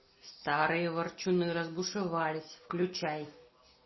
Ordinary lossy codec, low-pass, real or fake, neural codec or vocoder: MP3, 24 kbps; 7.2 kHz; real; none